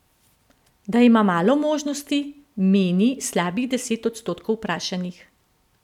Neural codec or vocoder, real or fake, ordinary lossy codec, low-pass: none; real; none; 19.8 kHz